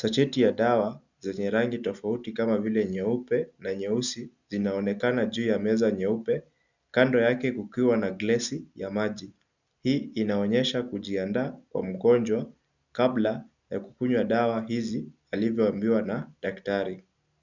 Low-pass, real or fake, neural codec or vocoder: 7.2 kHz; real; none